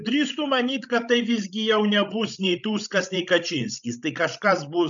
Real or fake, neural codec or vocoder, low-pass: fake; codec, 16 kHz, 16 kbps, FreqCodec, larger model; 7.2 kHz